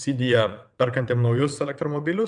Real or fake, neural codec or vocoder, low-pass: fake; vocoder, 22.05 kHz, 80 mel bands, WaveNeXt; 9.9 kHz